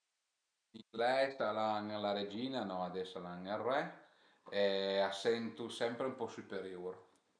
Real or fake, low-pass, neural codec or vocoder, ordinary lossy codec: real; 9.9 kHz; none; none